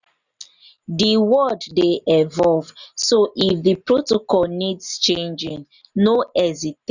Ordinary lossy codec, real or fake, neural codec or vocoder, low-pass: none; real; none; 7.2 kHz